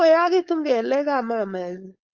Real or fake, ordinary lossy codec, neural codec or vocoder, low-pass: fake; Opus, 32 kbps; codec, 16 kHz, 4.8 kbps, FACodec; 7.2 kHz